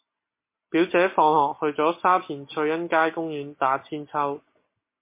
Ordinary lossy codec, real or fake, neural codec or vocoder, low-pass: MP3, 24 kbps; real; none; 3.6 kHz